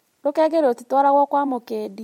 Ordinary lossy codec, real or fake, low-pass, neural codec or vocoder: MP3, 64 kbps; fake; 19.8 kHz; vocoder, 44.1 kHz, 128 mel bands every 256 samples, BigVGAN v2